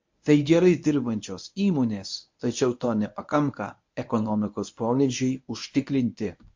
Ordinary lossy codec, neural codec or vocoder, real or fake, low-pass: MP3, 48 kbps; codec, 24 kHz, 0.9 kbps, WavTokenizer, medium speech release version 1; fake; 7.2 kHz